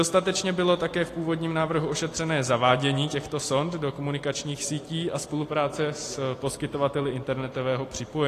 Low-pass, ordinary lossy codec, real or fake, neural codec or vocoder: 14.4 kHz; AAC, 48 kbps; real; none